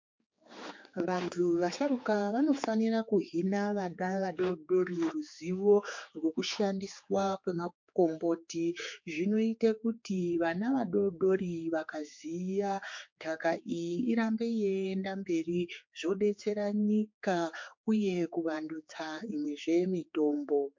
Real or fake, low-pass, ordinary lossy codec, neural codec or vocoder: fake; 7.2 kHz; MP3, 48 kbps; codec, 16 kHz, 4 kbps, X-Codec, HuBERT features, trained on general audio